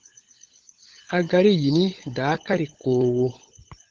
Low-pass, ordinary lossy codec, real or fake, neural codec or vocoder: 7.2 kHz; Opus, 16 kbps; fake; codec, 16 kHz, 16 kbps, FunCodec, trained on LibriTTS, 50 frames a second